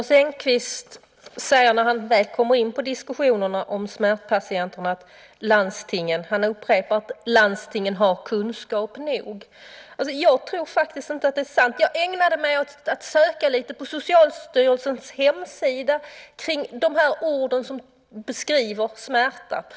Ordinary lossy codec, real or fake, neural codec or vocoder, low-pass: none; real; none; none